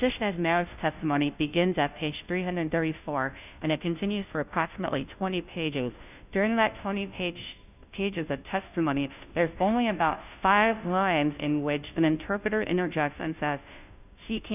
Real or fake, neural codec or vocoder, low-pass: fake; codec, 16 kHz, 0.5 kbps, FunCodec, trained on Chinese and English, 25 frames a second; 3.6 kHz